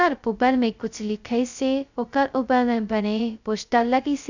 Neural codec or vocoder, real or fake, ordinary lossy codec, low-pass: codec, 16 kHz, 0.2 kbps, FocalCodec; fake; none; 7.2 kHz